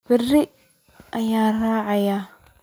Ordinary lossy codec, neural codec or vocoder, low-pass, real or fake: none; none; none; real